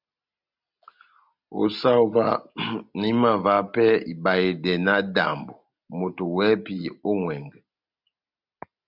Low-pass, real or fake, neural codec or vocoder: 5.4 kHz; real; none